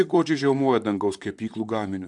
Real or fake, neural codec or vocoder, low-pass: fake; vocoder, 44.1 kHz, 128 mel bands, Pupu-Vocoder; 10.8 kHz